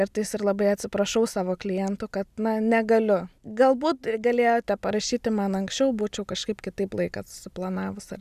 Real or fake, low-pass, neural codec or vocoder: real; 14.4 kHz; none